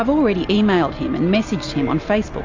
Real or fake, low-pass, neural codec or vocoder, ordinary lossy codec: real; 7.2 kHz; none; AAC, 48 kbps